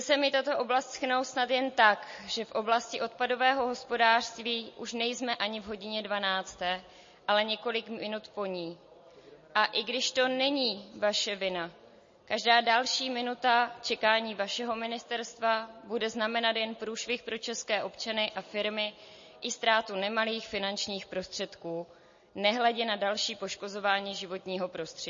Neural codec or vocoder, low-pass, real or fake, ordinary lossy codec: none; 7.2 kHz; real; MP3, 32 kbps